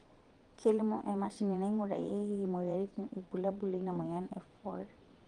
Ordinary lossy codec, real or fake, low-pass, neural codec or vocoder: Opus, 24 kbps; fake; 9.9 kHz; vocoder, 22.05 kHz, 80 mel bands, Vocos